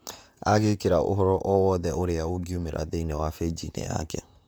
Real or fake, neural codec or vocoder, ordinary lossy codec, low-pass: fake; vocoder, 44.1 kHz, 128 mel bands, Pupu-Vocoder; none; none